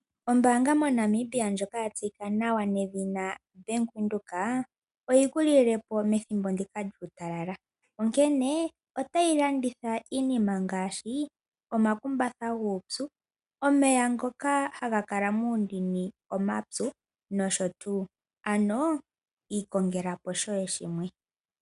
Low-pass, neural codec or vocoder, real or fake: 10.8 kHz; none; real